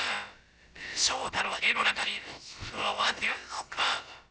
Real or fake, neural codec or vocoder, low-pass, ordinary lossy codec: fake; codec, 16 kHz, about 1 kbps, DyCAST, with the encoder's durations; none; none